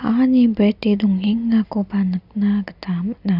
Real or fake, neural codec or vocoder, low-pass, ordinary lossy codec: real; none; 5.4 kHz; none